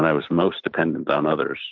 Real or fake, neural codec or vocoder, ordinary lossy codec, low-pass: real; none; MP3, 48 kbps; 7.2 kHz